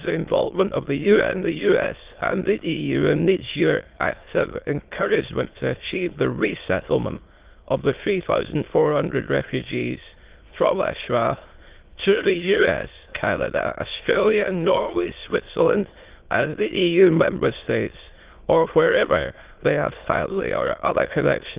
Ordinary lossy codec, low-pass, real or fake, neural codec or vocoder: Opus, 32 kbps; 3.6 kHz; fake; autoencoder, 22.05 kHz, a latent of 192 numbers a frame, VITS, trained on many speakers